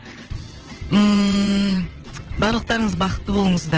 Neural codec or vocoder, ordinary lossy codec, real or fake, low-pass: vocoder, 22.05 kHz, 80 mel bands, WaveNeXt; Opus, 16 kbps; fake; 7.2 kHz